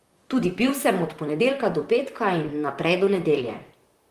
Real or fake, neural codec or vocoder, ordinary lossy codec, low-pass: fake; vocoder, 44.1 kHz, 128 mel bands, Pupu-Vocoder; Opus, 24 kbps; 14.4 kHz